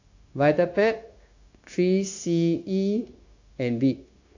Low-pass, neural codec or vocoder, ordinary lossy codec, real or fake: 7.2 kHz; codec, 16 kHz, 0.9 kbps, LongCat-Audio-Codec; AAC, 48 kbps; fake